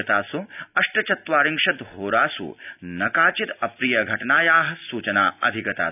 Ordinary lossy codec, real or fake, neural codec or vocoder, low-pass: none; real; none; 3.6 kHz